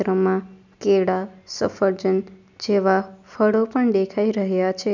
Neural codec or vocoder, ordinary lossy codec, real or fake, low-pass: none; none; real; 7.2 kHz